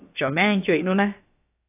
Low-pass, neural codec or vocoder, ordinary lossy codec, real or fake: 3.6 kHz; codec, 16 kHz, about 1 kbps, DyCAST, with the encoder's durations; AAC, 24 kbps; fake